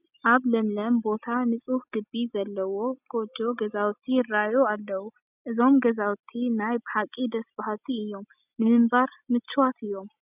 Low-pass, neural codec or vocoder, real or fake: 3.6 kHz; none; real